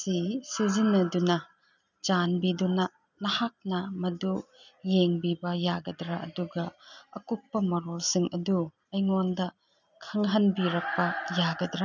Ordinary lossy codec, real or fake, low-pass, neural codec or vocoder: none; real; 7.2 kHz; none